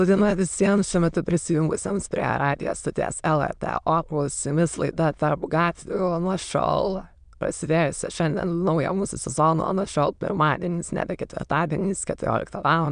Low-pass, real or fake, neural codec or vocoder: 9.9 kHz; fake; autoencoder, 22.05 kHz, a latent of 192 numbers a frame, VITS, trained on many speakers